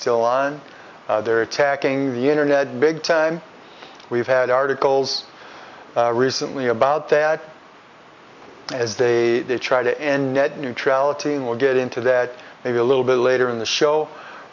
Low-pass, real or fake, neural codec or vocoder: 7.2 kHz; real; none